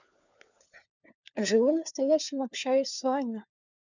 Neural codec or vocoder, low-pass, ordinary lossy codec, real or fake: codec, 16 kHz, 4 kbps, FunCodec, trained on LibriTTS, 50 frames a second; 7.2 kHz; none; fake